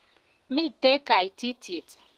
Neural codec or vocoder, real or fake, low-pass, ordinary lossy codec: codec, 32 kHz, 1.9 kbps, SNAC; fake; 14.4 kHz; Opus, 24 kbps